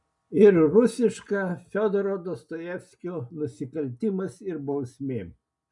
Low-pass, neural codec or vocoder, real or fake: 10.8 kHz; none; real